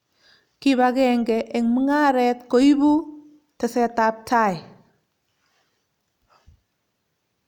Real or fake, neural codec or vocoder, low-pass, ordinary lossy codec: real; none; 19.8 kHz; none